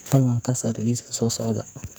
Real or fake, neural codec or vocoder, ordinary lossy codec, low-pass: fake; codec, 44.1 kHz, 2.6 kbps, SNAC; none; none